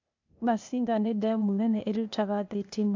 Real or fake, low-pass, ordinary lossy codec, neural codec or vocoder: fake; 7.2 kHz; MP3, 64 kbps; codec, 16 kHz, 0.8 kbps, ZipCodec